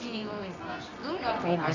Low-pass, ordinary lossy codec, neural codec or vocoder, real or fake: 7.2 kHz; none; codec, 24 kHz, 0.9 kbps, WavTokenizer, medium music audio release; fake